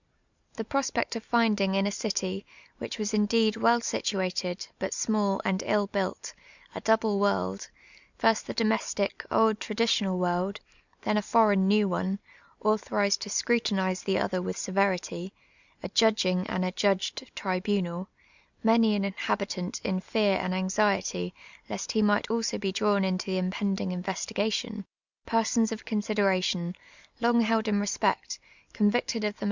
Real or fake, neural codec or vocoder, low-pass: real; none; 7.2 kHz